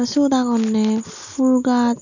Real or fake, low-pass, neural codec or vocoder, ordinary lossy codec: real; 7.2 kHz; none; none